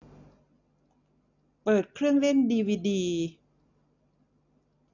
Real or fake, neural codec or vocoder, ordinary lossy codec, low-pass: real; none; none; 7.2 kHz